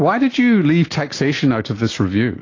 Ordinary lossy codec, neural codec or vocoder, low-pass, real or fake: AAC, 32 kbps; none; 7.2 kHz; real